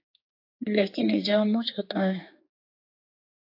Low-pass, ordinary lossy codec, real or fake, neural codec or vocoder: 5.4 kHz; AAC, 32 kbps; fake; codec, 16 kHz, 4 kbps, X-Codec, HuBERT features, trained on general audio